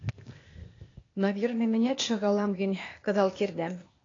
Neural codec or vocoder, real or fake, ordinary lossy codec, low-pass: codec, 16 kHz, 0.8 kbps, ZipCodec; fake; AAC, 32 kbps; 7.2 kHz